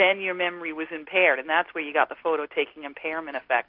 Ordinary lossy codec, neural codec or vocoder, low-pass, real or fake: AAC, 48 kbps; none; 5.4 kHz; real